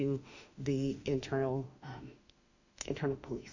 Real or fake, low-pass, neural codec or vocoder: fake; 7.2 kHz; autoencoder, 48 kHz, 32 numbers a frame, DAC-VAE, trained on Japanese speech